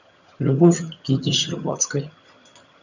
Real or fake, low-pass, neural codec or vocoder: fake; 7.2 kHz; vocoder, 22.05 kHz, 80 mel bands, HiFi-GAN